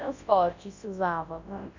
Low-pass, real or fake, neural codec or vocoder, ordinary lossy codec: 7.2 kHz; fake; codec, 24 kHz, 0.9 kbps, WavTokenizer, large speech release; Opus, 64 kbps